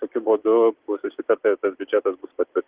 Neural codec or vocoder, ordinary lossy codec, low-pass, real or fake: none; Opus, 16 kbps; 3.6 kHz; real